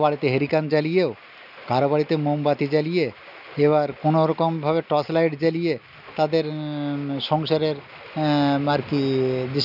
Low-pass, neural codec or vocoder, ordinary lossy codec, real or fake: 5.4 kHz; none; none; real